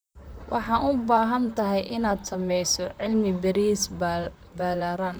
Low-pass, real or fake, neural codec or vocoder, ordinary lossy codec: none; fake; vocoder, 44.1 kHz, 128 mel bands, Pupu-Vocoder; none